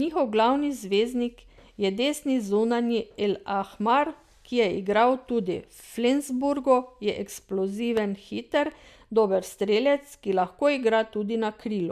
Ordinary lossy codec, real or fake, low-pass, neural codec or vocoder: MP3, 96 kbps; real; 14.4 kHz; none